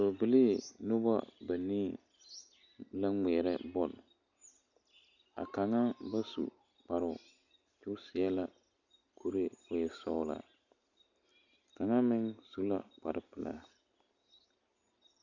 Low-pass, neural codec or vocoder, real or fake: 7.2 kHz; none; real